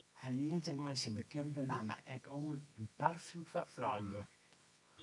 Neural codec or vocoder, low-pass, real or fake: codec, 24 kHz, 0.9 kbps, WavTokenizer, medium music audio release; 10.8 kHz; fake